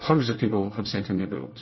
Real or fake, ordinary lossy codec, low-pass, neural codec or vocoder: fake; MP3, 24 kbps; 7.2 kHz; codec, 24 kHz, 1 kbps, SNAC